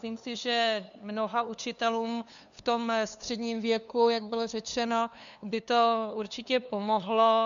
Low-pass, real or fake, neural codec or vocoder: 7.2 kHz; fake; codec, 16 kHz, 2 kbps, FunCodec, trained on LibriTTS, 25 frames a second